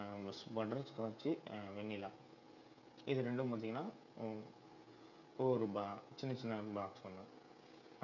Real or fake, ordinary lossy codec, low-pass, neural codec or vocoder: fake; none; 7.2 kHz; codec, 16 kHz, 16 kbps, FreqCodec, smaller model